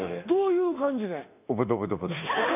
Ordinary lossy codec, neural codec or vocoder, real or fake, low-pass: none; autoencoder, 48 kHz, 32 numbers a frame, DAC-VAE, trained on Japanese speech; fake; 3.6 kHz